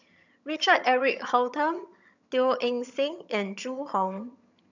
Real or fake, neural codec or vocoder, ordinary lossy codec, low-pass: fake; vocoder, 22.05 kHz, 80 mel bands, HiFi-GAN; none; 7.2 kHz